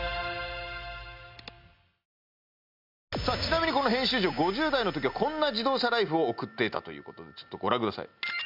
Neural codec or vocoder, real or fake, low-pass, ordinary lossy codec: none; real; 5.4 kHz; none